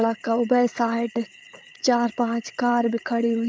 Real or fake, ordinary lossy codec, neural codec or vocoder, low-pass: fake; none; codec, 16 kHz, 8 kbps, FreqCodec, larger model; none